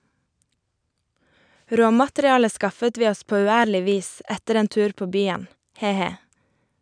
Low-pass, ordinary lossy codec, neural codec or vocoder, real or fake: 9.9 kHz; none; none; real